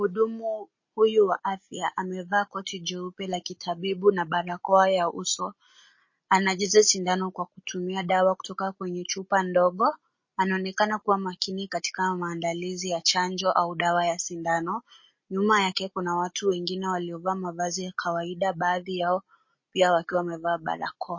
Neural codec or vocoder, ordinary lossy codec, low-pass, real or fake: none; MP3, 32 kbps; 7.2 kHz; real